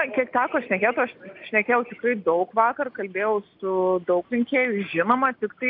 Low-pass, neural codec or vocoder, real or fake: 5.4 kHz; none; real